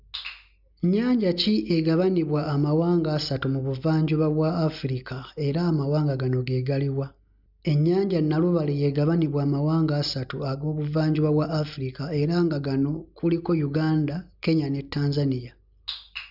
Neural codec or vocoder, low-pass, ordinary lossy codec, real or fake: none; 5.4 kHz; none; real